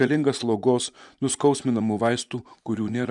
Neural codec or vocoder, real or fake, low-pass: vocoder, 24 kHz, 100 mel bands, Vocos; fake; 10.8 kHz